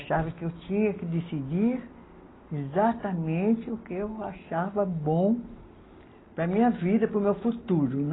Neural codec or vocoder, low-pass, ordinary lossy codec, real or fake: none; 7.2 kHz; AAC, 16 kbps; real